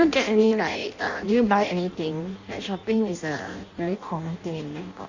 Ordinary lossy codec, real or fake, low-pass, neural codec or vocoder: Opus, 64 kbps; fake; 7.2 kHz; codec, 16 kHz in and 24 kHz out, 0.6 kbps, FireRedTTS-2 codec